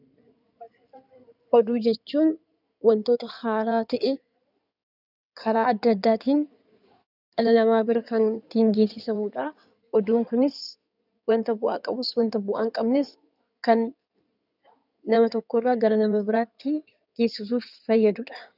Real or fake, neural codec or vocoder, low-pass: fake; codec, 16 kHz in and 24 kHz out, 2.2 kbps, FireRedTTS-2 codec; 5.4 kHz